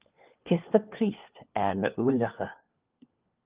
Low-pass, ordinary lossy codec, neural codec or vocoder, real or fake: 3.6 kHz; Opus, 32 kbps; codec, 16 kHz, 4 kbps, FunCodec, trained on LibriTTS, 50 frames a second; fake